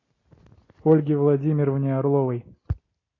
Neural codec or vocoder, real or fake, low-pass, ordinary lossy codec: none; real; 7.2 kHz; AAC, 32 kbps